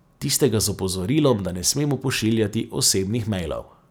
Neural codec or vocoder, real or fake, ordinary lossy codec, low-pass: none; real; none; none